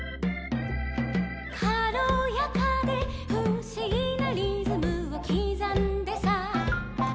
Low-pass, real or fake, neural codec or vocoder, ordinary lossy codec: none; real; none; none